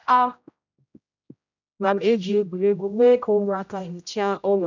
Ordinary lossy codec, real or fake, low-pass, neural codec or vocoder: none; fake; 7.2 kHz; codec, 16 kHz, 0.5 kbps, X-Codec, HuBERT features, trained on general audio